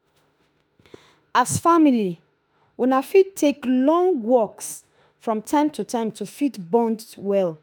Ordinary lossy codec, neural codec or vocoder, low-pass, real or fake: none; autoencoder, 48 kHz, 32 numbers a frame, DAC-VAE, trained on Japanese speech; none; fake